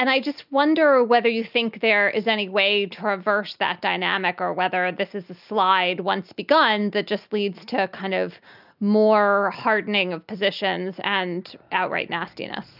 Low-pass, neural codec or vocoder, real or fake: 5.4 kHz; none; real